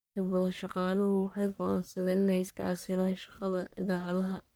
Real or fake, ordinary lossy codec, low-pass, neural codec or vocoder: fake; none; none; codec, 44.1 kHz, 1.7 kbps, Pupu-Codec